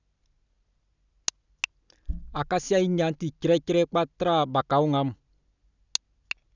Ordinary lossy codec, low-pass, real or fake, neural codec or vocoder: none; 7.2 kHz; real; none